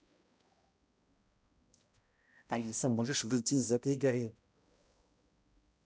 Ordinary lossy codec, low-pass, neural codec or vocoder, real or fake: none; none; codec, 16 kHz, 0.5 kbps, X-Codec, HuBERT features, trained on balanced general audio; fake